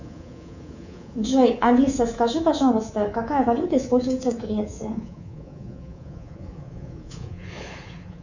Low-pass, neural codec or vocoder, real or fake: 7.2 kHz; codec, 24 kHz, 3.1 kbps, DualCodec; fake